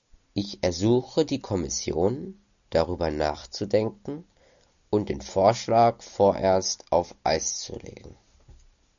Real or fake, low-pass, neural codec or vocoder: real; 7.2 kHz; none